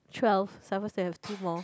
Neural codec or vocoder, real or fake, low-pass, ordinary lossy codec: none; real; none; none